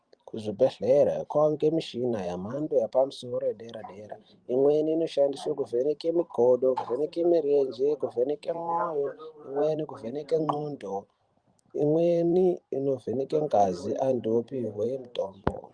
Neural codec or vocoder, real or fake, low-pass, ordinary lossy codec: vocoder, 44.1 kHz, 128 mel bands every 512 samples, BigVGAN v2; fake; 9.9 kHz; Opus, 32 kbps